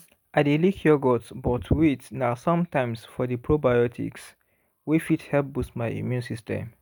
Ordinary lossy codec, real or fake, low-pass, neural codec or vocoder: none; real; none; none